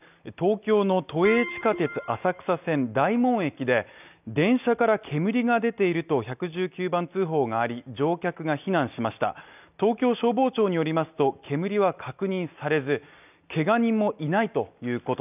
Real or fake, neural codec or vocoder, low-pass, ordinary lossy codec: real; none; 3.6 kHz; none